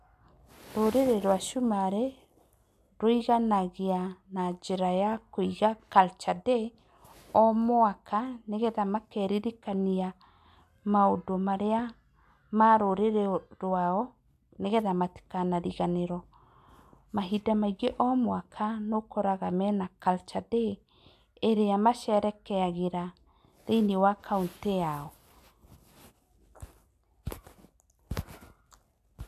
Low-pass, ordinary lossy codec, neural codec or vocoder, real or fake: 14.4 kHz; AAC, 96 kbps; none; real